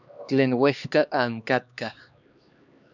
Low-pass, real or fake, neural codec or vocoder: 7.2 kHz; fake; codec, 16 kHz, 2 kbps, X-Codec, HuBERT features, trained on LibriSpeech